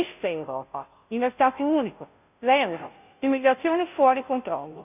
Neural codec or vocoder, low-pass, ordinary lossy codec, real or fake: codec, 16 kHz, 0.5 kbps, FunCodec, trained on Chinese and English, 25 frames a second; 3.6 kHz; none; fake